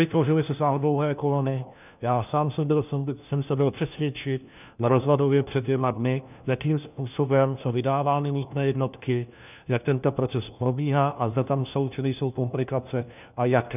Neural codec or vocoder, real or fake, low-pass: codec, 16 kHz, 1 kbps, FunCodec, trained on LibriTTS, 50 frames a second; fake; 3.6 kHz